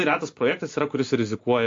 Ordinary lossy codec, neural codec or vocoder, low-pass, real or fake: AAC, 48 kbps; none; 7.2 kHz; real